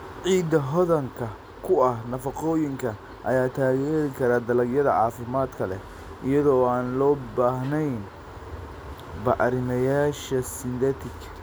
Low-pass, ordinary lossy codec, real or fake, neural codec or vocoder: none; none; real; none